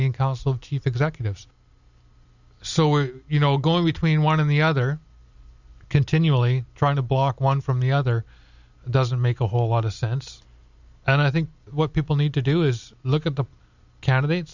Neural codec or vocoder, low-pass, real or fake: none; 7.2 kHz; real